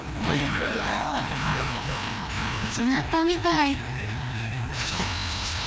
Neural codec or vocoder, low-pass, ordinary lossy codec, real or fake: codec, 16 kHz, 1 kbps, FreqCodec, larger model; none; none; fake